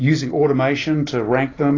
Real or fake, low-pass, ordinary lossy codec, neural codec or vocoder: real; 7.2 kHz; AAC, 32 kbps; none